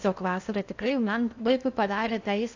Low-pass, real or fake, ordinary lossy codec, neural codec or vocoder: 7.2 kHz; fake; AAC, 48 kbps; codec, 16 kHz in and 24 kHz out, 0.8 kbps, FocalCodec, streaming, 65536 codes